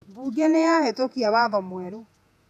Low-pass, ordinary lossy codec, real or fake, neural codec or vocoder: 14.4 kHz; none; fake; vocoder, 48 kHz, 128 mel bands, Vocos